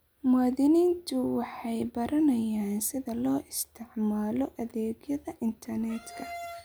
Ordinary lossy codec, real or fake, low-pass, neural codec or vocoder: none; real; none; none